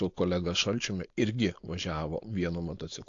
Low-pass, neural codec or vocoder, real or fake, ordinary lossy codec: 7.2 kHz; codec, 16 kHz, 4.8 kbps, FACodec; fake; AAC, 48 kbps